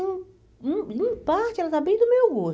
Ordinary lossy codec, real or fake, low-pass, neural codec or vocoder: none; real; none; none